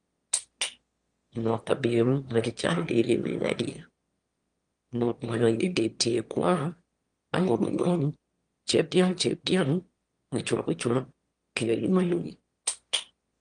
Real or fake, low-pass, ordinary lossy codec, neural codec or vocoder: fake; 9.9 kHz; Opus, 32 kbps; autoencoder, 22.05 kHz, a latent of 192 numbers a frame, VITS, trained on one speaker